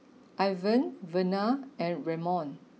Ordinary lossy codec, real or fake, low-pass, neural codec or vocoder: none; real; none; none